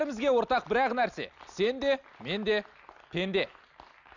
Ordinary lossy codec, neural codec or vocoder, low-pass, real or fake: none; none; 7.2 kHz; real